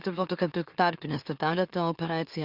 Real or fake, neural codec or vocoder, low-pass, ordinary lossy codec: fake; autoencoder, 44.1 kHz, a latent of 192 numbers a frame, MeloTTS; 5.4 kHz; Opus, 64 kbps